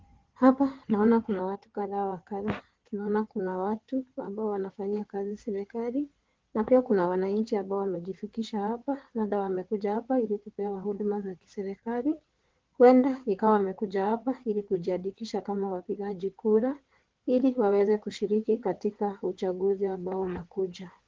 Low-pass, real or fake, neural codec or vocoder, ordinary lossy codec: 7.2 kHz; fake; codec, 16 kHz in and 24 kHz out, 2.2 kbps, FireRedTTS-2 codec; Opus, 16 kbps